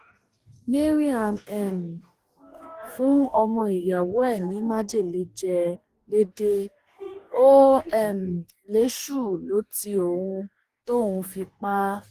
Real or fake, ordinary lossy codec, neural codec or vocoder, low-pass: fake; Opus, 24 kbps; codec, 44.1 kHz, 2.6 kbps, DAC; 14.4 kHz